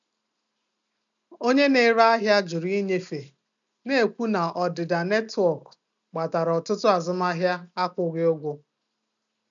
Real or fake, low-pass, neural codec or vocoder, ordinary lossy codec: real; 7.2 kHz; none; none